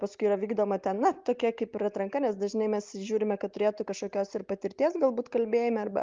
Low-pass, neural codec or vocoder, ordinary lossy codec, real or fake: 7.2 kHz; none; Opus, 32 kbps; real